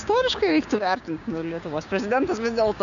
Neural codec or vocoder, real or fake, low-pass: codec, 16 kHz, 6 kbps, DAC; fake; 7.2 kHz